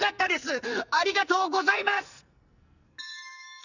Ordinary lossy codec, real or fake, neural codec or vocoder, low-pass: none; fake; codec, 44.1 kHz, 2.6 kbps, SNAC; 7.2 kHz